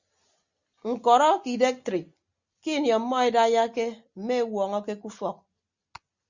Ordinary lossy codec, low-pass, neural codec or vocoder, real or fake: Opus, 64 kbps; 7.2 kHz; none; real